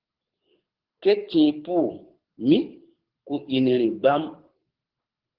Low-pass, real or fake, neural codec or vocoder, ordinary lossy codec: 5.4 kHz; fake; codec, 24 kHz, 6 kbps, HILCodec; Opus, 16 kbps